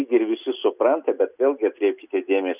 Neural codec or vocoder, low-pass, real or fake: none; 3.6 kHz; real